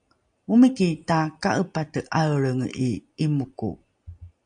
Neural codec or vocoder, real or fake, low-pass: none; real; 9.9 kHz